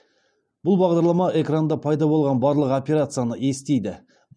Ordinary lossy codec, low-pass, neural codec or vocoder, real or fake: none; 9.9 kHz; none; real